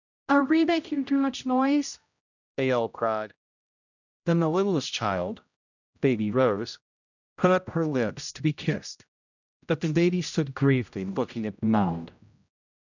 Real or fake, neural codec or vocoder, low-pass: fake; codec, 16 kHz, 0.5 kbps, X-Codec, HuBERT features, trained on general audio; 7.2 kHz